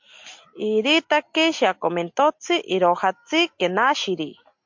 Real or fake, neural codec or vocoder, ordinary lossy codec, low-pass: real; none; MP3, 48 kbps; 7.2 kHz